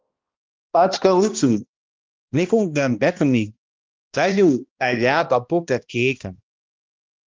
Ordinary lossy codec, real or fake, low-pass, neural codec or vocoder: Opus, 24 kbps; fake; 7.2 kHz; codec, 16 kHz, 1 kbps, X-Codec, HuBERT features, trained on balanced general audio